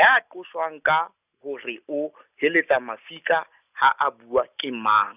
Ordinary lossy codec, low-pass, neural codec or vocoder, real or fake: none; 3.6 kHz; none; real